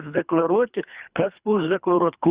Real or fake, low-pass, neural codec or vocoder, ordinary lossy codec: fake; 3.6 kHz; codec, 24 kHz, 3 kbps, HILCodec; Opus, 64 kbps